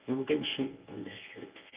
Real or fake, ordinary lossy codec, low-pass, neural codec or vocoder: fake; Opus, 32 kbps; 3.6 kHz; codec, 24 kHz, 0.9 kbps, WavTokenizer, medium speech release version 1